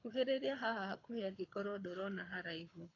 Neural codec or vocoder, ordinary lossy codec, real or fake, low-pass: codec, 24 kHz, 6 kbps, HILCodec; AAC, 32 kbps; fake; 7.2 kHz